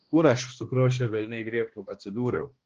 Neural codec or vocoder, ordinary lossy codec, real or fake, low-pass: codec, 16 kHz, 1 kbps, X-Codec, HuBERT features, trained on balanced general audio; Opus, 16 kbps; fake; 7.2 kHz